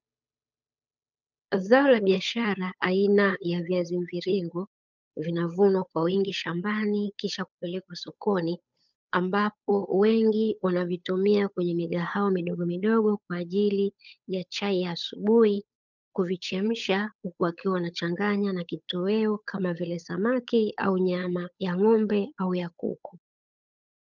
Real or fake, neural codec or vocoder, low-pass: fake; codec, 16 kHz, 8 kbps, FunCodec, trained on Chinese and English, 25 frames a second; 7.2 kHz